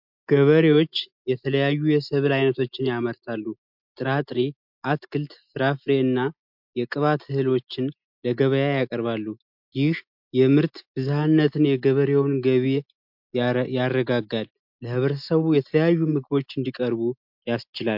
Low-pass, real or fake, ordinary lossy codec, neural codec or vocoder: 5.4 kHz; real; MP3, 48 kbps; none